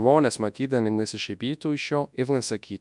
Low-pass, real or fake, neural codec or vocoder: 10.8 kHz; fake; codec, 24 kHz, 0.9 kbps, WavTokenizer, large speech release